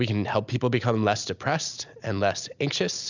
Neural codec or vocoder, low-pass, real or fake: none; 7.2 kHz; real